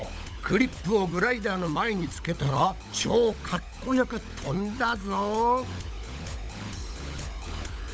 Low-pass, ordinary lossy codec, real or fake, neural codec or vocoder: none; none; fake; codec, 16 kHz, 16 kbps, FunCodec, trained on LibriTTS, 50 frames a second